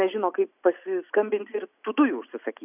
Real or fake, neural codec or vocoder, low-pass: real; none; 3.6 kHz